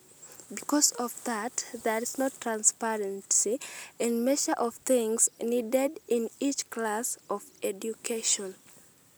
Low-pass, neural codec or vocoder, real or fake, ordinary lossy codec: none; none; real; none